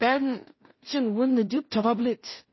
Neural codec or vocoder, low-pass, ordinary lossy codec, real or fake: codec, 16 kHz in and 24 kHz out, 0.4 kbps, LongCat-Audio-Codec, two codebook decoder; 7.2 kHz; MP3, 24 kbps; fake